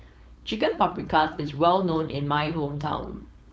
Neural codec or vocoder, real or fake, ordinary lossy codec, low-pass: codec, 16 kHz, 4.8 kbps, FACodec; fake; none; none